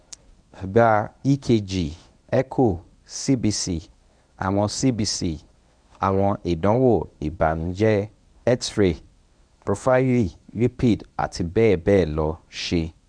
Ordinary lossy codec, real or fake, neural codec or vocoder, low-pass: none; fake; codec, 24 kHz, 0.9 kbps, WavTokenizer, medium speech release version 1; 9.9 kHz